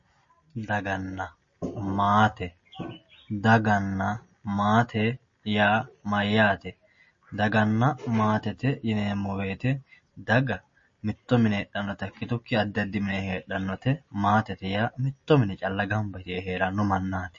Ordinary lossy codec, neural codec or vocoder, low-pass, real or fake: MP3, 32 kbps; none; 7.2 kHz; real